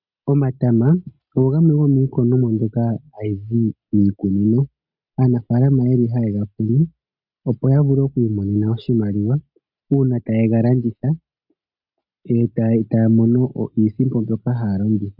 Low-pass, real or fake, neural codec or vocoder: 5.4 kHz; real; none